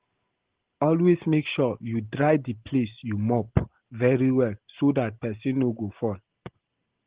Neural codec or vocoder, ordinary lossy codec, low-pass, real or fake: none; Opus, 16 kbps; 3.6 kHz; real